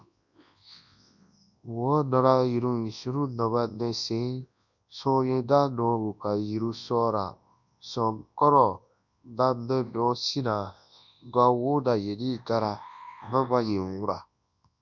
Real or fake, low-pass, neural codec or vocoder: fake; 7.2 kHz; codec, 24 kHz, 0.9 kbps, WavTokenizer, large speech release